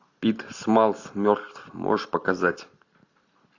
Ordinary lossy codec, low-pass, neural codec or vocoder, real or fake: AAC, 48 kbps; 7.2 kHz; none; real